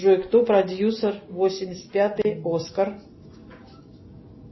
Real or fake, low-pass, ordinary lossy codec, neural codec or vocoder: real; 7.2 kHz; MP3, 24 kbps; none